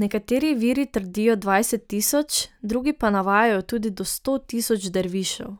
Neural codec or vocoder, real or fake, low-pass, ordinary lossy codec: none; real; none; none